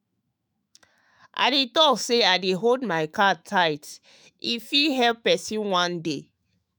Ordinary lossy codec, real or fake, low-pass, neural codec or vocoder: none; fake; none; autoencoder, 48 kHz, 128 numbers a frame, DAC-VAE, trained on Japanese speech